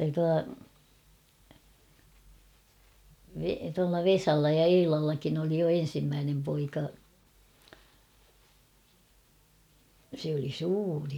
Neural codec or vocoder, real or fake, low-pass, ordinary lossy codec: none; real; 19.8 kHz; none